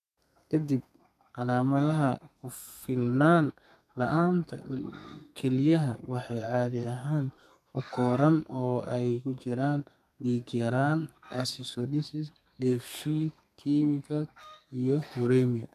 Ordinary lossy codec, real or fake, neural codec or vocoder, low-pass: none; fake; codec, 32 kHz, 1.9 kbps, SNAC; 14.4 kHz